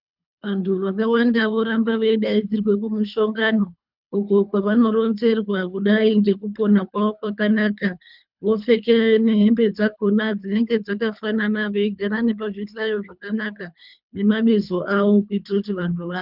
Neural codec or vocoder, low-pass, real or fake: codec, 24 kHz, 3 kbps, HILCodec; 5.4 kHz; fake